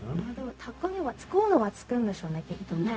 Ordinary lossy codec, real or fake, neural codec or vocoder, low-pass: none; fake; codec, 16 kHz, 0.4 kbps, LongCat-Audio-Codec; none